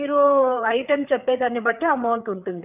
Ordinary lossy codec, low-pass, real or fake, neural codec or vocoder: none; 3.6 kHz; fake; codec, 16 kHz, 4 kbps, FreqCodec, larger model